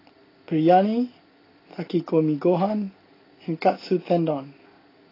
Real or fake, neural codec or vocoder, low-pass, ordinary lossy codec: real; none; 5.4 kHz; AAC, 24 kbps